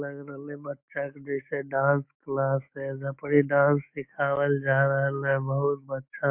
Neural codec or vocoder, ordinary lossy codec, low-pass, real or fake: autoencoder, 48 kHz, 128 numbers a frame, DAC-VAE, trained on Japanese speech; MP3, 32 kbps; 3.6 kHz; fake